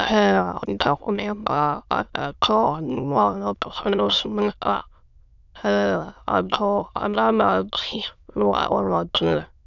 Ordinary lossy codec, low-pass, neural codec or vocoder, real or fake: none; 7.2 kHz; autoencoder, 22.05 kHz, a latent of 192 numbers a frame, VITS, trained on many speakers; fake